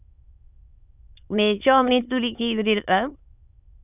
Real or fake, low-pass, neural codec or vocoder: fake; 3.6 kHz; autoencoder, 22.05 kHz, a latent of 192 numbers a frame, VITS, trained on many speakers